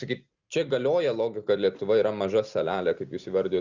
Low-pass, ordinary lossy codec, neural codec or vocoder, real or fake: 7.2 kHz; Opus, 64 kbps; none; real